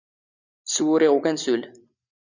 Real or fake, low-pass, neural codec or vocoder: real; 7.2 kHz; none